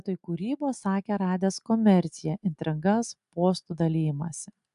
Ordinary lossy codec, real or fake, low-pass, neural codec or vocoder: AAC, 96 kbps; real; 10.8 kHz; none